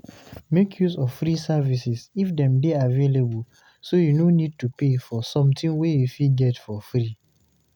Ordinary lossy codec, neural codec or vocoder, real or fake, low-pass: none; none; real; 19.8 kHz